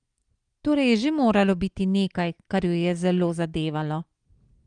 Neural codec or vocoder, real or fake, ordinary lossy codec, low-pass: none; real; Opus, 24 kbps; 9.9 kHz